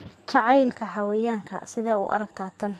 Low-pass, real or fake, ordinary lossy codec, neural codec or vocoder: 14.4 kHz; fake; MP3, 96 kbps; codec, 44.1 kHz, 2.6 kbps, SNAC